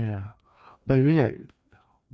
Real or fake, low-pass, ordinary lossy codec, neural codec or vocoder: fake; none; none; codec, 16 kHz, 2 kbps, FreqCodec, larger model